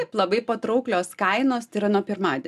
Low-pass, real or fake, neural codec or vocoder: 14.4 kHz; real; none